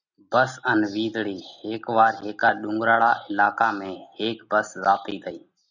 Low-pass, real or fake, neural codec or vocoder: 7.2 kHz; real; none